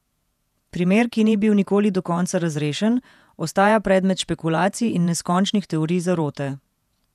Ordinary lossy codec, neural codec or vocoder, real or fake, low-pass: none; vocoder, 48 kHz, 128 mel bands, Vocos; fake; 14.4 kHz